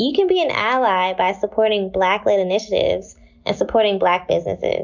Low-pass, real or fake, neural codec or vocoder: 7.2 kHz; real; none